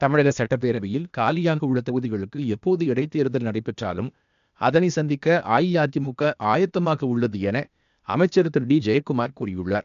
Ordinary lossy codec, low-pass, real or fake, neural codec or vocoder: AAC, 96 kbps; 7.2 kHz; fake; codec, 16 kHz, 0.8 kbps, ZipCodec